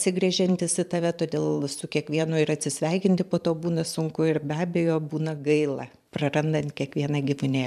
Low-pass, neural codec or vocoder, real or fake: 14.4 kHz; vocoder, 44.1 kHz, 128 mel bands every 512 samples, BigVGAN v2; fake